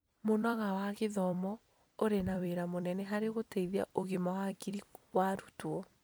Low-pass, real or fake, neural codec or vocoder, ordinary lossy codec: none; fake; vocoder, 44.1 kHz, 128 mel bands every 256 samples, BigVGAN v2; none